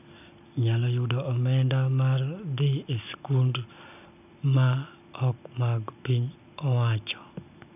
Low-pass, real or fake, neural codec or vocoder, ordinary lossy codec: 3.6 kHz; real; none; none